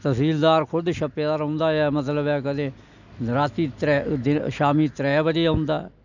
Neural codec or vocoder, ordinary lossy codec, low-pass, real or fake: none; none; 7.2 kHz; real